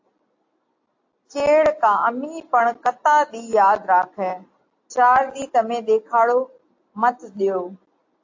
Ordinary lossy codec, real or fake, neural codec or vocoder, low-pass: AAC, 48 kbps; real; none; 7.2 kHz